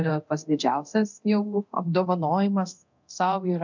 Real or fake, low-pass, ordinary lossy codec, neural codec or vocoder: fake; 7.2 kHz; MP3, 64 kbps; codec, 24 kHz, 0.9 kbps, DualCodec